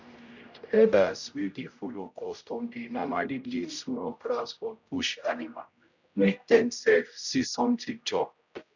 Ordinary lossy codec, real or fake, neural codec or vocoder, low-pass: none; fake; codec, 16 kHz, 0.5 kbps, X-Codec, HuBERT features, trained on general audio; 7.2 kHz